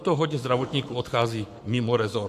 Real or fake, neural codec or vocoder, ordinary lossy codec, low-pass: fake; codec, 44.1 kHz, 7.8 kbps, Pupu-Codec; AAC, 96 kbps; 14.4 kHz